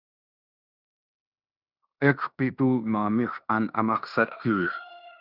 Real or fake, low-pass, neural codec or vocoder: fake; 5.4 kHz; codec, 16 kHz in and 24 kHz out, 0.9 kbps, LongCat-Audio-Codec, fine tuned four codebook decoder